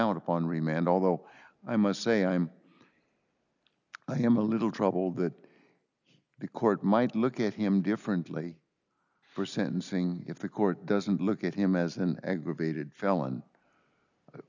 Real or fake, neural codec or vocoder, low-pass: real; none; 7.2 kHz